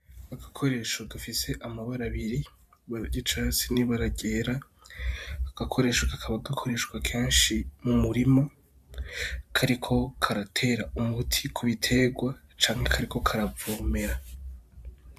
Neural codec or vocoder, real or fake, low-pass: vocoder, 44.1 kHz, 128 mel bands every 512 samples, BigVGAN v2; fake; 14.4 kHz